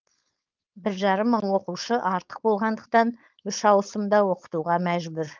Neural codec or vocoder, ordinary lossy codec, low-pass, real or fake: codec, 16 kHz, 4.8 kbps, FACodec; Opus, 24 kbps; 7.2 kHz; fake